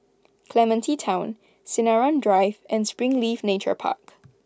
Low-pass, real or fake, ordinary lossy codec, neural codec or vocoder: none; real; none; none